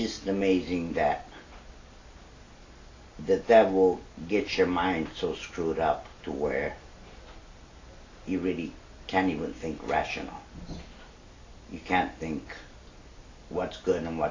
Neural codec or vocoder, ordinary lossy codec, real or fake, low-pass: none; AAC, 32 kbps; real; 7.2 kHz